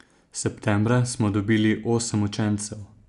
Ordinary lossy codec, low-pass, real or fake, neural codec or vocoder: none; 10.8 kHz; real; none